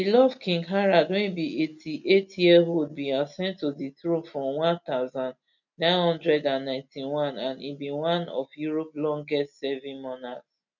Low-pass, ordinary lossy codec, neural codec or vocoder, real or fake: 7.2 kHz; none; none; real